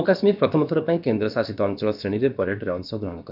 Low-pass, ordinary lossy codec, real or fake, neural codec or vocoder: 5.4 kHz; none; fake; codec, 16 kHz, about 1 kbps, DyCAST, with the encoder's durations